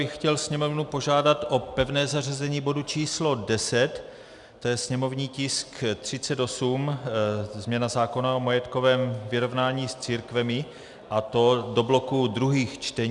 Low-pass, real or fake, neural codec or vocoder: 10.8 kHz; real; none